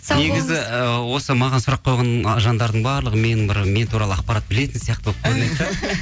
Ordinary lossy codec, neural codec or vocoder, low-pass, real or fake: none; none; none; real